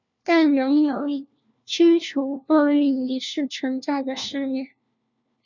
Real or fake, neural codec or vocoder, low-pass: fake; codec, 16 kHz, 1 kbps, FunCodec, trained on LibriTTS, 50 frames a second; 7.2 kHz